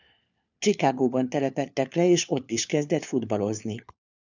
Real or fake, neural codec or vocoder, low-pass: fake; codec, 16 kHz, 4 kbps, FunCodec, trained on LibriTTS, 50 frames a second; 7.2 kHz